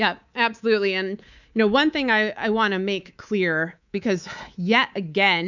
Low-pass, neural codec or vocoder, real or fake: 7.2 kHz; codec, 24 kHz, 3.1 kbps, DualCodec; fake